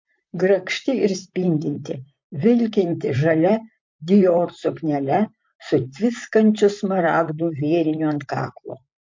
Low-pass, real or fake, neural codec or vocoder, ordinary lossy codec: 7.2 kHz; fake; vocoder, 44.1 kHz, 128 mel bands, Pupu-Vocoder; MP3, 48 kbps